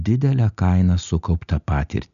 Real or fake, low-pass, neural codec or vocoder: real; 7.2 kHz; none